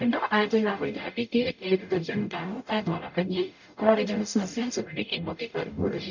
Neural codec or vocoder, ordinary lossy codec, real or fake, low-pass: codec, 44.1 kHz, 0.9 kbps, DAC; none; fake; 7.2 kHz